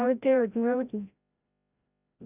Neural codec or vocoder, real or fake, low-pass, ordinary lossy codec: codec, 16 kHz, 0.5 kbps, FreqCodec, larger model; fake; 3.6 kHz; none